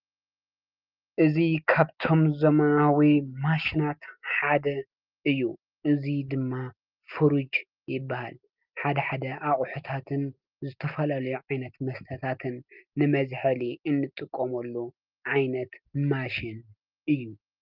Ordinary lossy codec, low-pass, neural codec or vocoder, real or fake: Opus, 32 kbps; 5.4 kHz; none; real